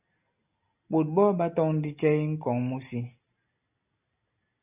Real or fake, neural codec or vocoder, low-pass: real; none; 3.6 kHz